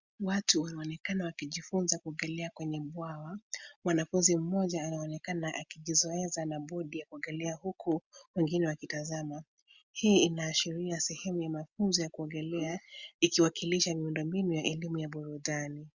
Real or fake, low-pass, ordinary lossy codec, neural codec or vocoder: real; 7.2 kHz; Opus, 64 kbps; none